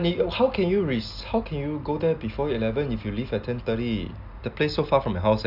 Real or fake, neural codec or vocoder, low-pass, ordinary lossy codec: real; none; 5.4 kHz; none